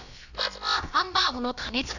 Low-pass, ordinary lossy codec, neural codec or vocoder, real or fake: 7.2 kHz; none; codec, 16 kHz, about 1 kbps, DyCAST, with the encoder's durations; fake